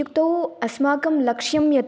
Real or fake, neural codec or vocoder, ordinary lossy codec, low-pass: real; none; none; none